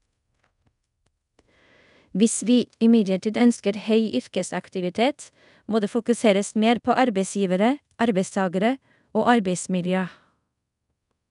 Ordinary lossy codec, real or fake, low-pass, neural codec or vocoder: none; fake; 10.8 kHz; codec, 24 kHz, 0.5 kbps, DualCodec